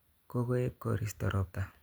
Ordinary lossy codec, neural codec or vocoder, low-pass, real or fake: none; none; none; real